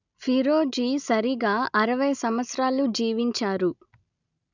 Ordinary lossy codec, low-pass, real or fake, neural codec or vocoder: none; 7.2 kHz; real; none